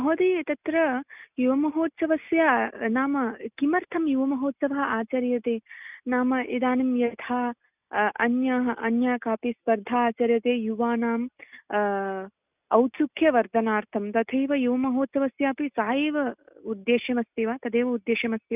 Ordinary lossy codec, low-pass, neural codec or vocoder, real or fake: none; 3.6 kHz; none; real